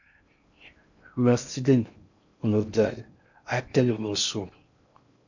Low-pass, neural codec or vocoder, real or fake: 7.2 kHz; codec, 16 kHz in and 24 kHz out, 0.6 kbps, FocalCodec, streaming, 2048 codes; fake